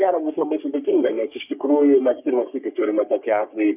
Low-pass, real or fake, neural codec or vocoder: 3.6 kHz; fake; codec, 44.1 kHz, 3.4 kbps, Pupu-Codec